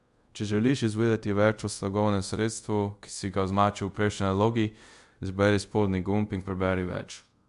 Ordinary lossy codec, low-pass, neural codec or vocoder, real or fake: MP3, 64 kbps; 10.8 kHz; codec, 24 kHz, 0.5 kbps, DualCodec; fake